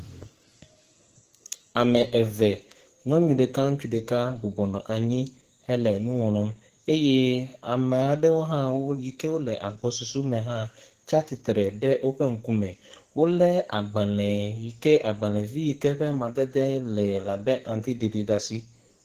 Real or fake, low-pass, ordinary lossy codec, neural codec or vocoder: fake; 14.4 kHz; Opus, 16 kbps; codec, 44.1 kHz, 2.6 kbps, SNAC